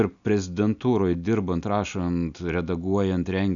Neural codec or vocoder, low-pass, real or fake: none; 7.2 kHz; real